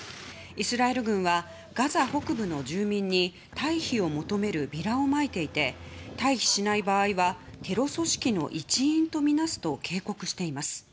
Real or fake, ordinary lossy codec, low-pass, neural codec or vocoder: real; none; none; none